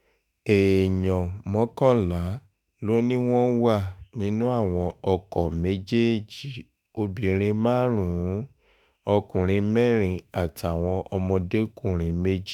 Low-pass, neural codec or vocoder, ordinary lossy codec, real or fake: 19.8 kHz; autoencoder, 48 kHz, 32 numbers a frame, DAC-VAE, trained on Japanese speech; none; fake